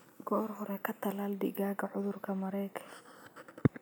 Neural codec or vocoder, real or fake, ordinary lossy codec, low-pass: none; real; none; none